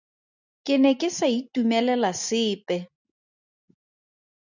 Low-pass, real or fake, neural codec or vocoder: 7.2 kHz; real; none